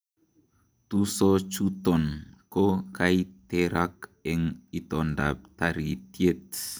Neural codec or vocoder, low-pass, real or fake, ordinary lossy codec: none; none; real; none